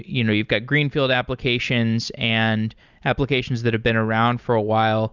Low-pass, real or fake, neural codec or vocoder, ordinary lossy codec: 7.2 kHz; real; none; Opus, 64 kbps